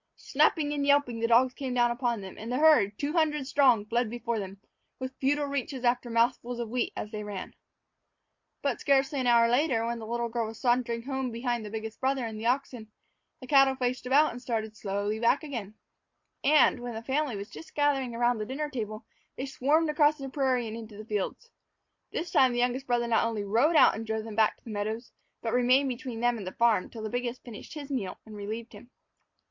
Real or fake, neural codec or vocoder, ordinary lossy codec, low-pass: real; none; MP3, 48 kbps; 7.2 kHz